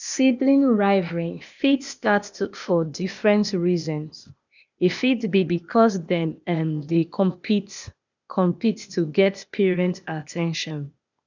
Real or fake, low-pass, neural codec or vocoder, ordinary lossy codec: fake; 7.2 kHz; codec, 16 kHz, 0.8 kbps, ZipCodec; none